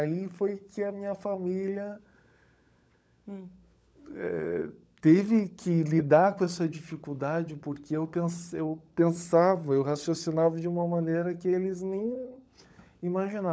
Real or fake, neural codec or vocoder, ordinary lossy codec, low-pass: fake; codec, 16 kHz, 16 kbps, FunCodec, trained on LibriTTS, 50 frames a second; none; none